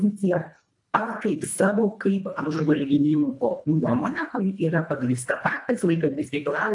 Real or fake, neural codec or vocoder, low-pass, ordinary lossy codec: fake; codec, 24 kHz, 1.5 kbps, HILCodec; 10.8 kHz; AAC, 64 kbps